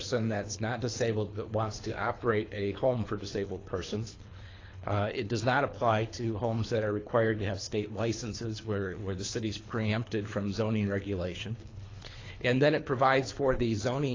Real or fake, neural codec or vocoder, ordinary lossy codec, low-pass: fake; codec, 24 kHz, 3 kbps, HILCodec; AAC, 32 kbps; 7.2 kHz